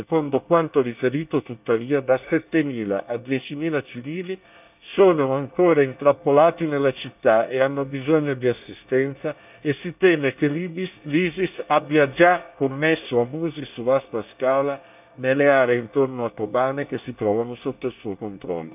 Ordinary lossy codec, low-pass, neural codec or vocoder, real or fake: none; 3.6 kHz; codec, 24 kHz, 1 kbps, SNAC; fake